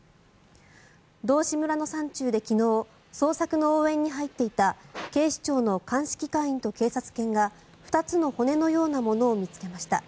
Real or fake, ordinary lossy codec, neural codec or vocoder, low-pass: real; none; none; none